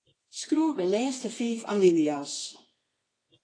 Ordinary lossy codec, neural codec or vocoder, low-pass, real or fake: AAC, 32 kbps; codec, 24 kHz, 0.9 kbps, WavTokenizer, medium music audio release; 9.9 kHz; fake